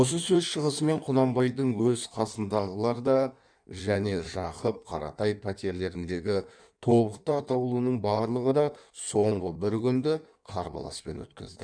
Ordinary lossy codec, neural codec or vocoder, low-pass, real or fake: none; codec, 16 kHz in and 24 kHz out, 1.1 kbps, FireRedTTS-2 codec; 9.9 kHz; fake